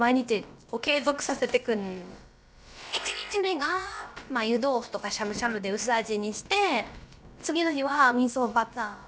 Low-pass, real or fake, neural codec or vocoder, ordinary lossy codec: none; fake; codec, 16 kHz, about 1 kbps, DyCAST, with the encoder's durations; none